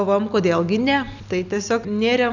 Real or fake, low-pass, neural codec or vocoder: real; 7.2 kHz; none